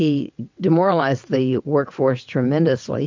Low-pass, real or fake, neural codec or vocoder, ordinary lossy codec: 7.2 kHz; fake; vocoder, 22.05 kHz, 80 mel bands, WaveNeXt; MP3, 64 kbps